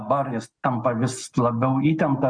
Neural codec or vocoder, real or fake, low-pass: none; real; 9.9 kHz